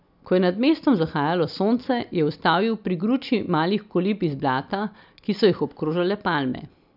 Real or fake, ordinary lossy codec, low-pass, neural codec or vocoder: real; none; 5.4 kHz; none